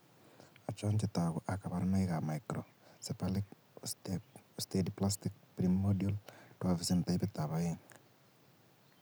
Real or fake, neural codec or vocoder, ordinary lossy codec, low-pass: real; none; none; none